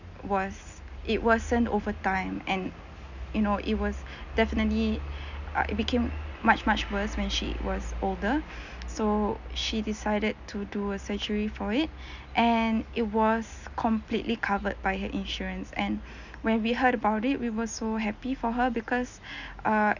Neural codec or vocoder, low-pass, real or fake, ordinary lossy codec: none; 7.2 kHz; real; none